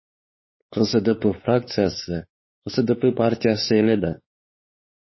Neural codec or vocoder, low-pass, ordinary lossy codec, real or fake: codec, 16 kHz, 4 kbps, X-Codec, WavLM features, trained on Multilingual LibriSpeech; 7.2 kHz; MP3, 24 kbps; fake